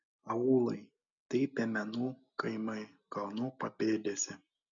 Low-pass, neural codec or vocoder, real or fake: 7.2 kHz; none; real